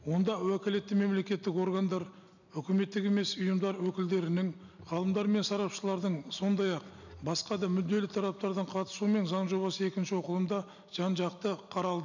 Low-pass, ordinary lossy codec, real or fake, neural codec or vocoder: 7.2 kHz; none; real; none